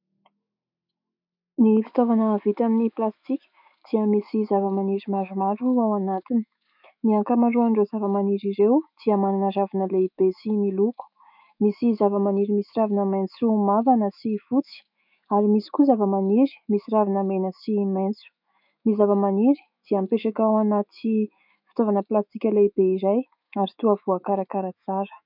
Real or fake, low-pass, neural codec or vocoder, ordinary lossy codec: fake; 5.4 kHz; autoencoder, 48 kHz, 128 numbers a frame, DAC-VAE, trained on Japanese speech; MP3, 48 kbps